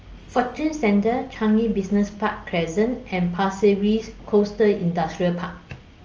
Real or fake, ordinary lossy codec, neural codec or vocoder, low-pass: real; Opus, 24 kbps; none; 7.2 kHz